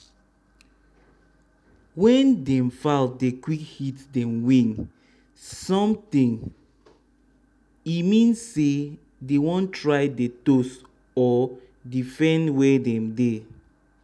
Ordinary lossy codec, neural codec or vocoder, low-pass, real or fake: none; none; none; real